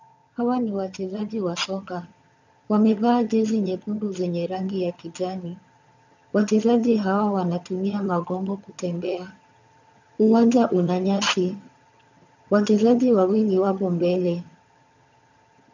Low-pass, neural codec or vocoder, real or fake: 7.2 kHz; vocoder, 22.05 kHz, 80 mel bands, HiFi-GAN; fake